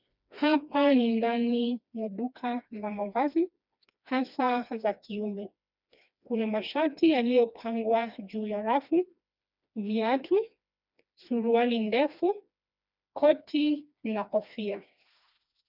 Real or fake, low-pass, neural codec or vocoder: fake; 5.4 kHz; codec, 16 kHz, 2 kbps, FreqCodec, smaller model